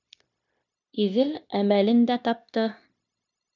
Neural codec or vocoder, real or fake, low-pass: codec, 16 kHz, 0.9 kbps, LongCat-Audio-Codec; fake; 7.2 kHz